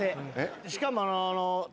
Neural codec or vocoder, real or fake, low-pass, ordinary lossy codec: none; real; none; none